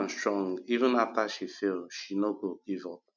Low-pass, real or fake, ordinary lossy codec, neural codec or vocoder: 7.2 kHz; real; none; none